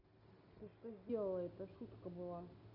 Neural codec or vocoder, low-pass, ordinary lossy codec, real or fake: none; 5.4 kHz; none; real